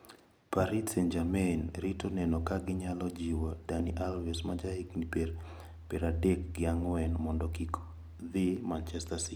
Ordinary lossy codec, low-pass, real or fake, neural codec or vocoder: none; none; real; none